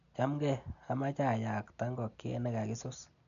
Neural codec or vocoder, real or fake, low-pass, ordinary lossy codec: none; real; 7.2 kHz; none